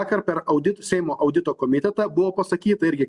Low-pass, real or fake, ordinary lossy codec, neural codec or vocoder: 10.8 kHz; real; Opus, 64 kbps; none